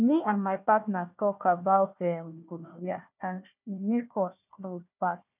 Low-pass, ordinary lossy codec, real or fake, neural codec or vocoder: 3.6 kHz; none; fake; codec, 16 kHz, 1 kbps, FunCodec, trained on Chinese and English, 50 frames a second